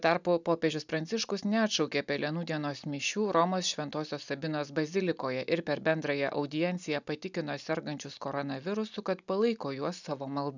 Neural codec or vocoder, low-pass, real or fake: none; 7.2 kHz; real